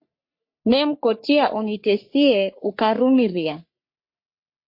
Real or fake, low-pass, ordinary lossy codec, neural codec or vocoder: fake; 5.4 kHz; MP3, 32 kbps; codec, 44.1 kHz, 3.4 kbps, Pupu-Codec